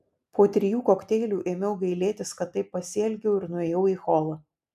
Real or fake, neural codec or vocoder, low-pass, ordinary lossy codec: real; none; 14.4 kHz; MP3, 96 kbps